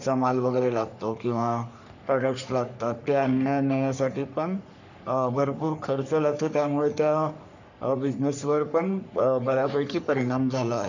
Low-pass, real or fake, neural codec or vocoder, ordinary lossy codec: 7.2 kHz; fake; codec, 44.1 kHz, 3.4 kbps, Pupu-Codec; none